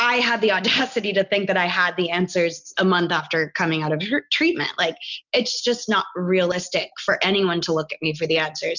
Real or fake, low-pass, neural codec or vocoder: real; 7.2 kHz; none